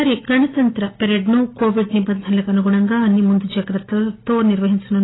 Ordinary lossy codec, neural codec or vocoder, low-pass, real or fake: AAC, 16 kbps; none; 7.2 kHz; real